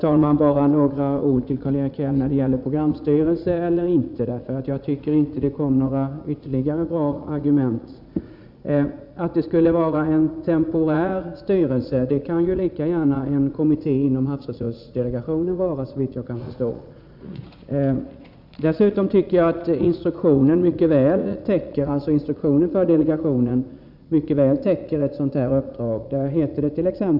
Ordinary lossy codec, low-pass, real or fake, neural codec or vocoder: none; 5.4 kHz; fake; vocoder, 44.1 kHz, 80 mel bands, Vocos